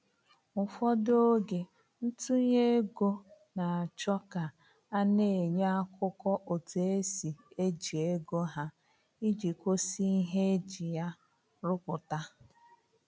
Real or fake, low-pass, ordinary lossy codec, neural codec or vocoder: real; none; none; none